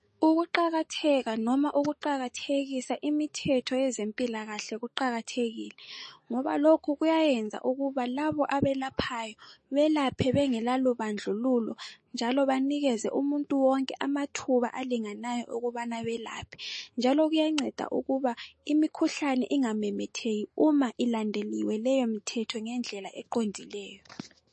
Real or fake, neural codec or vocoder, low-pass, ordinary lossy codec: fake; autoencoder, 48 kHz, 128 numbers a frame, DAC-VAE, trained on Japanese speech; 10.8 kHz; MP3, 32 kbps